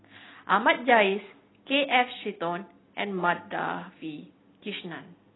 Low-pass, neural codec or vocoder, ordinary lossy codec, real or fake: 7.2 kHz; none; AAC, 16 kbps; real